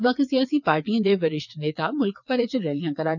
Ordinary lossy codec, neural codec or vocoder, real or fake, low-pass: none; vocoder, 22.05 kHz, 80 mel bands, WaveNeXt; fake; 7.2 kHz